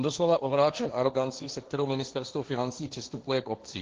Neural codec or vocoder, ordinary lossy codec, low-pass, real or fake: codec, 16 kHz, 1.1 kbps, Voila-Tokenizer; Opus, 16 kbps; 7.2 kHz; fake